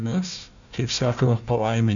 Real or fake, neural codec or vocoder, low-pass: fake; codec, 16 kHz, 1 kbps, FunCodec, trained on Chinese and English, 50 frames a second; 7.2 kHz